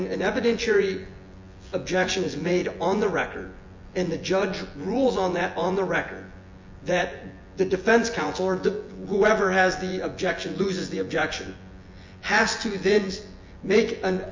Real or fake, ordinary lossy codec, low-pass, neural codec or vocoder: fake; MP3, 48 kbps; 7.2 kHz; vocoder, 24 kHz, 100 mel bands, Vocos